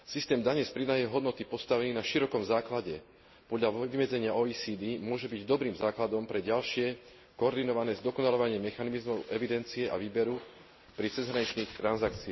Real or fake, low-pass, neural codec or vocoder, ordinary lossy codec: real; 7.2 kHz; none; MP3, 24 kbps